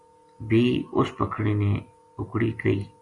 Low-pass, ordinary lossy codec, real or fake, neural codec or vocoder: 10.8 kHz; Opus, 64 kbps; real; none